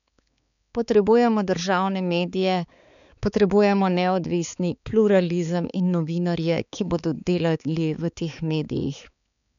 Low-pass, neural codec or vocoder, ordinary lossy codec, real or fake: 7.2 kHz; codec, 16 kHz, 4 kbps, X-Codec, HuBERT features, trained on balanced general audio; none; fake